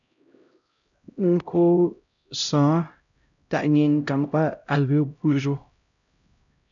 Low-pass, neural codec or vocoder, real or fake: 7.2 kHz; codec, 16 kHz, 0.5 kbps, X-Codec, HuBERT features, trained on LibriSpeech; fake